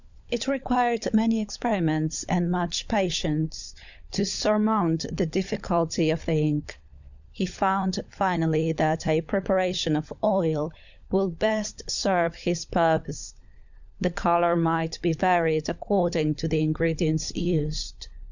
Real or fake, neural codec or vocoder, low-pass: fake; codec, 16 kHz, 16 kbps, FunCodec, trained on LibriTTS, 50 frames a second; 7.2 kHz